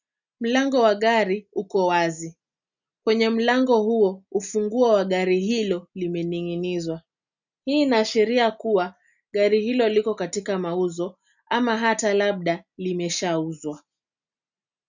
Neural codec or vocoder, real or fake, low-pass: none; real; 7.2 kHz